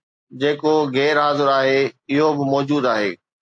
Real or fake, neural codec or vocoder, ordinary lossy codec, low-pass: real; none; AAC, 64 kbps; 9.9 kHz